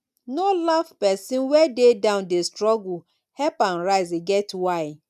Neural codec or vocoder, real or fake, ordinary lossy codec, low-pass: none; real; none; 14.4 kHz